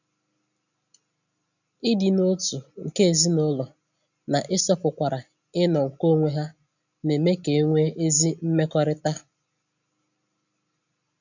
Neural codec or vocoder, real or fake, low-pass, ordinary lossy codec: none; real; 7.2 kHz; none